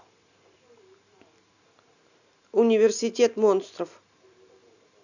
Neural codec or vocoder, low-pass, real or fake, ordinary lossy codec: none; 7.2 kHz; real; none